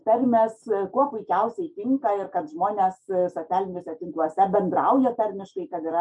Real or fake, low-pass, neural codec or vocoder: real; 10.8 kHz; none